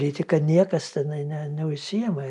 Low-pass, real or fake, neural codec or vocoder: 10.8 kHz; real; none